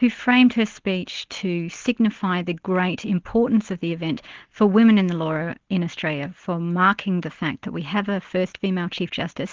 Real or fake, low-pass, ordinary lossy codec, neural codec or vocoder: real; 7.2 kHz; Opus, 16 kbps; none